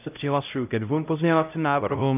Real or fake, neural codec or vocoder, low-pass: fake; codec, 16 kHz, 0.5 kbps, X-Codec, HuBERT features, trained on LibriSpeech; 3.6 kHz